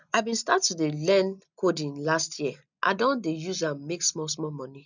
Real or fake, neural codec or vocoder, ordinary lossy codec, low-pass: real; none; none; 7.2 kHz